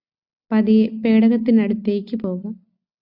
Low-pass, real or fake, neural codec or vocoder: 5.4 kHz; real; none